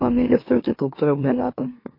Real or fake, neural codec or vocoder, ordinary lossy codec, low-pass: fake; autoencoder, 44.1 kHz, a latent of 192 numbers a frame, MeloTTS; MP3, 32 kbps; 5.4 kHz